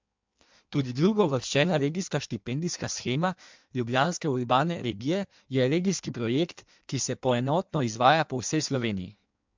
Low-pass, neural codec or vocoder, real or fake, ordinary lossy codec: 7.2 kHz; codec, 16 kHz in and 24 kHz out, 1.1 kbps, FireRedTTS-2 codec; fake; MP3, 64 kbps